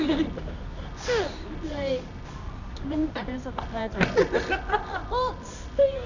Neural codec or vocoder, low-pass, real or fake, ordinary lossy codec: codec, 24 kHz, 0.9 kbps, WavTokenizer, medium music audio release; 7.2 kHz; fake; none